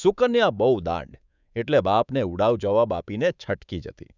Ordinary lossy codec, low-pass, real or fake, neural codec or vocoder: none; 7.2 kHz; fake; codec, 24 kHz, 3.1 kbps, DualCodec